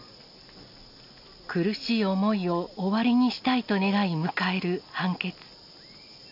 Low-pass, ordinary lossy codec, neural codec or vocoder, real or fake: 5.4 kHz; none; none; real